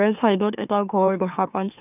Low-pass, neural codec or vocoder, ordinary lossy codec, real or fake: 3.6 kHz; autoencoder, 44.1 kHz, a latent of 192 numbers a frame, MeloTTS; none; fake